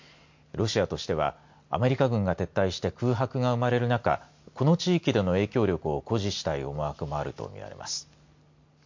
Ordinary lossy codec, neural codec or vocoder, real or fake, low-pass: MP3, 48 kbps; none; real; 7.2 kHz